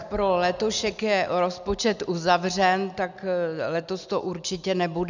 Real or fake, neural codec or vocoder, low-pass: real; none; 7.2 kHz